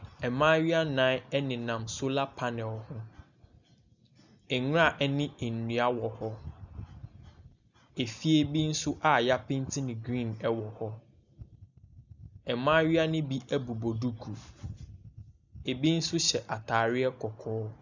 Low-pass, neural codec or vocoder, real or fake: 7.2 kHz; none; real